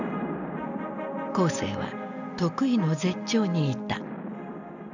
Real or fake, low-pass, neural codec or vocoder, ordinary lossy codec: real; 7.2 kHz; none; none